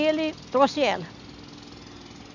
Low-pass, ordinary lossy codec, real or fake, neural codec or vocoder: 7.2 kHz; none; real; none